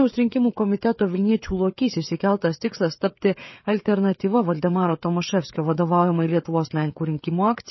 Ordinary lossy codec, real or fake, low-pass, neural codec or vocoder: MP3, 24 kbps; fake; 7.2 kHz; codec, 16 kHz, 16 kbps, FreqCodec, smaller model